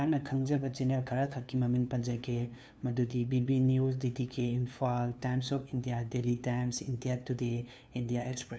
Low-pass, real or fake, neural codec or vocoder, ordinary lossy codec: none; fake; codec, 16 kHz, 2 kbps, FunCodec, trained on LibriTTS, 25 frames a second; none